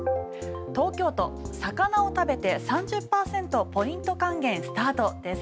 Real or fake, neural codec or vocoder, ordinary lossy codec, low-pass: real; none; none; none